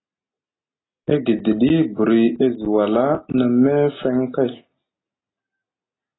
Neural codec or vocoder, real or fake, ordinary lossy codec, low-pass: none; real; AAC, 16 kbps; 7.2 kHz